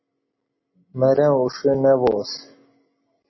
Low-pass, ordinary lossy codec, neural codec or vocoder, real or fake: 7.2 kHz; MP3, 24 kbps; none; real